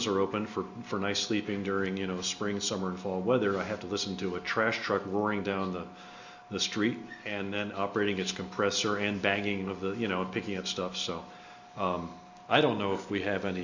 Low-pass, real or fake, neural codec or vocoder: 7.2 kHz; real; none